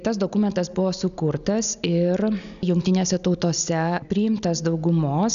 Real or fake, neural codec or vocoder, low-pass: real; none; 7.2 kHz